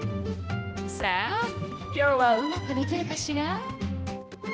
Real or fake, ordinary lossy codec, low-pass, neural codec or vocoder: fake; none; none; codec, 16 kHz, 1 kbps, X-Codec, HuBERT features, trained on balanced general audio